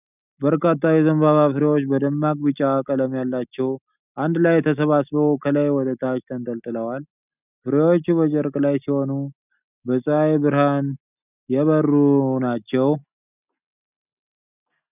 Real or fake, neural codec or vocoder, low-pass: real; none; 3.6 kHz